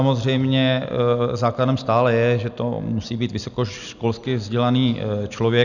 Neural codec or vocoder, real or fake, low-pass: none; real; 7.2 kHz